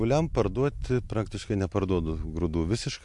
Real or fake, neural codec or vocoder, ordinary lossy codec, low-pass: real; none; MP3, 64 kbps; 10.8 kHz